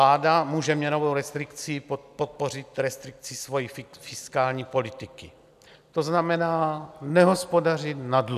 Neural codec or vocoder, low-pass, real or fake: none; 14.4 kHz; real